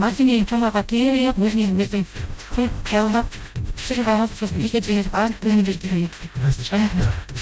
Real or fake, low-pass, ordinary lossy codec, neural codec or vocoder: fake; none; none; codec, 16 kHz, 0.5 kbps, FreqCodec, smaller model